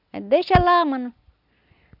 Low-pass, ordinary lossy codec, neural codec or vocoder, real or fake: 5.4 kHz; none; none; real